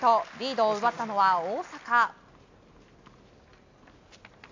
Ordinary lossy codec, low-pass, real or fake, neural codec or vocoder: AAC, 48 kbps; 7.2 kHz; real; none